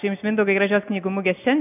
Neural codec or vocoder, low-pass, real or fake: none; 3.6 kHz; real